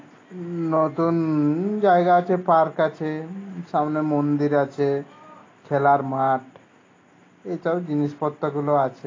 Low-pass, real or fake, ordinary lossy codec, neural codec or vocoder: 7.2 kHz; real; AAC, 32 kbps; none